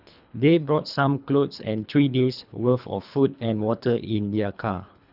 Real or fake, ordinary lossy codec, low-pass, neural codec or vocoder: fake; none; 5.4 kHz; codec, 24 kHz, 3 kbps, HILCodec